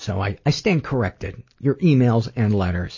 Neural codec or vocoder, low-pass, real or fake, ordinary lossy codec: none; 7.2 kHz; real; MP3, 32 kbps